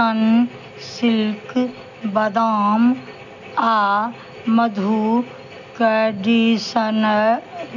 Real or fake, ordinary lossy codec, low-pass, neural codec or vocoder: real; none; 7.2 kHz; none